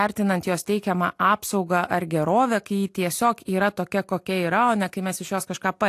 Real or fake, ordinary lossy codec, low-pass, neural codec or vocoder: real; AAC, 64 kbps; 14.4 kHz; none